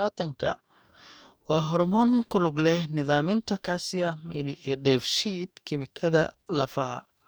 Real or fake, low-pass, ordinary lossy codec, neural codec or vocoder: fake; none; none; codec, 44.1 kHz, 2.6 kbps, DAC